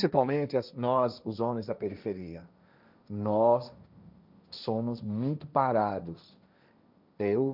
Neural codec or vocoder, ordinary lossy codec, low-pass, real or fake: codec, 16 kHz, 1.1 kbps, Voila-Tokenizer; none; 5.4 kHz; fake